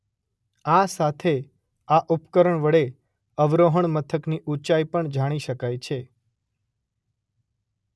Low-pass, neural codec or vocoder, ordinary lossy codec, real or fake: none; none; none; real